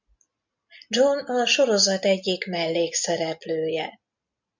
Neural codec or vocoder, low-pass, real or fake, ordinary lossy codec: none; 7.2 kHz; real; MP3, 64 kbps